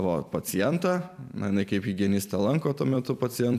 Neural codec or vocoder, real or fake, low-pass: vocoder, 44.1 kHz, 128 mel bands every 256 samples, BigVGAN v2; fake; 14.4 kHz